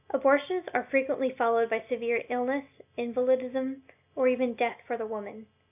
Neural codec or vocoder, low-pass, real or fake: none; 3.6 kHz; real